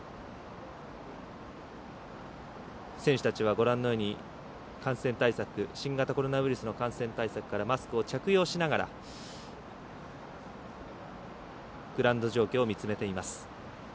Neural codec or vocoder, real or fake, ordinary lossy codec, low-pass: none; real; none; none